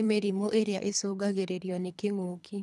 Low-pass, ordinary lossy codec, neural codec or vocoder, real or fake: none; none; codec, 24 kHz, 3 kbps, HILCodec; fake